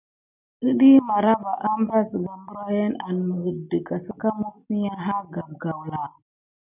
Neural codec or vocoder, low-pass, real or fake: none; 3.6 kHz; real